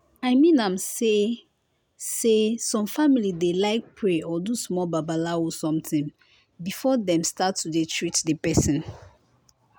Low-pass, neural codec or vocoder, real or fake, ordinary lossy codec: none; none; real; none